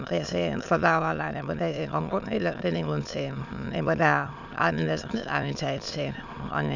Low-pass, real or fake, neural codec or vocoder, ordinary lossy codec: 7.2 kHz; fake; autoencoder, 22.05 kHz, a latent of 192 numbers a frame, VITS, trained on many speakers; none